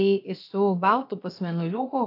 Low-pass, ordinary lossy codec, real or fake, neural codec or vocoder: 5.4 kHz; AAC, 32 kbps; fake; codec, 16 kHz, about 1 kbps, DyCAST, with the encoder's durations